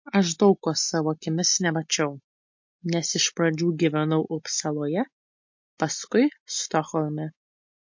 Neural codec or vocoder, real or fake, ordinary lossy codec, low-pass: none; real; MP3, 48 kbps; 7.2 kHz